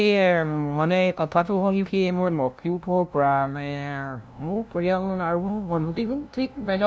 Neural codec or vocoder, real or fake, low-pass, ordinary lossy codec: codec, 16 kHz, 0.5 kbps, FunCodec, trained on LibriTTS, 25 frames a second; fake; none; none